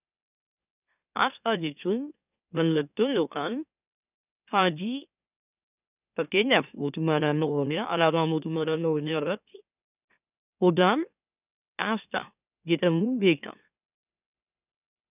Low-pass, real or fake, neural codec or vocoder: 3.6 kHz; fake; autoencoder, 44.1 kHz, a latent of 192 numbers a frame, MeloTTS